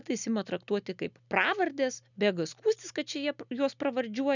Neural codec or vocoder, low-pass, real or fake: none; 7.2 kHz; real